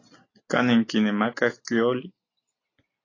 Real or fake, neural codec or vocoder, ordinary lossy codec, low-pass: real; none; AAC, 32 kbps; 7.2 kHz